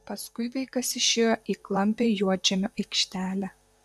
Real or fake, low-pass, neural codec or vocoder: fake; 14.4 kHz; vocoder, 44.1 kHz, 128 mel bands, Pupu-Vocoder